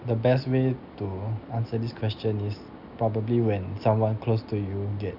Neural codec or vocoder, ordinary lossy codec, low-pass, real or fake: none; none; 5.4 kHz; real